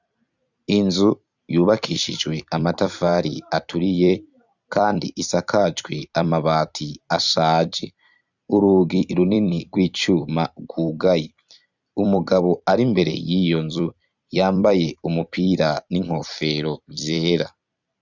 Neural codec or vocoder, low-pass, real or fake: vocoder, 44.1 kHz, 128 mel bands every 256 samples, BigVGAN v2; 7.2 kHz; fake